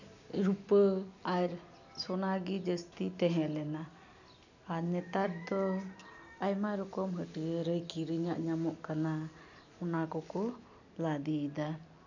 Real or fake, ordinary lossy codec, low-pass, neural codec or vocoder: real; none; 7.2 kHz; none